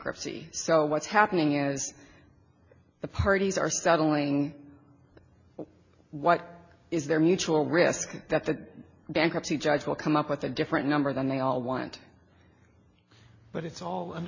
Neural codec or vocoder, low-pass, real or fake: none; 7.2 kHz; real